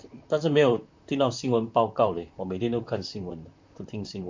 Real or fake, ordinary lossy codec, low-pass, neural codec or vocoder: fake; MP3, 64 kbps; 7.2 kHz; vocoder, 44.1 kHz, 128 mel bands, Pupu-Vocoder